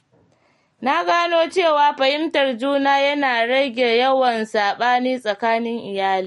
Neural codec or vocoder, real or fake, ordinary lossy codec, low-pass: none; real; MP3, 48 kbps; 19.8 kHz